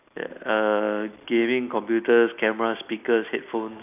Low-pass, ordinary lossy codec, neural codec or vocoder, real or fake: 3.6 kHz; none; none; real